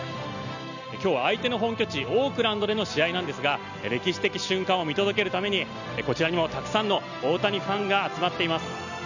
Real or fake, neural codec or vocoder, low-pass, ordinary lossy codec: real; none; 7.2 kHz; none